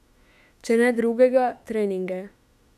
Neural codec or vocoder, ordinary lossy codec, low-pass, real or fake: autoencoder, 48 kHz, 32 numbers a frame, DAC-VAE, trained on Japanese speech; none; 14.4 kHz; fake